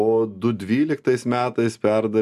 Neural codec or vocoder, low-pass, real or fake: none; 14.4 kHz; real